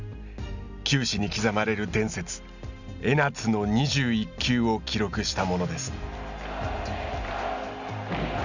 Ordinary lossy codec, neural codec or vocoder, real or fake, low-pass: none; none; real; 7.2 kHz